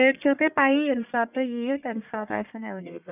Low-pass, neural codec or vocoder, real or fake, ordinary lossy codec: 3.6 kHz; codec, 44.1 kHz, 1.7 kbps, Pupu-Codec; fake; none